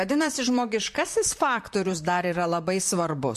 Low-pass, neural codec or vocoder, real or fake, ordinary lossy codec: 14.4 kHz; vocoder, 44.1 kHz, 128 mel bands, Pupu-Vocoder; fake; MP3, 64 kbps